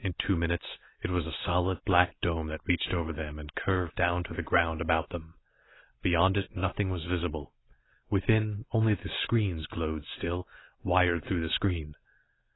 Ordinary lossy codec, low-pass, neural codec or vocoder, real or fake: AAC, 16 kbps; 7.2 kHz; none; real